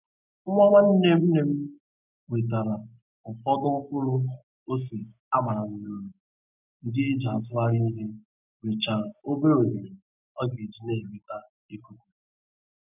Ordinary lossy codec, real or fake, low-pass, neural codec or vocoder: none; real; 3.6 kHz; none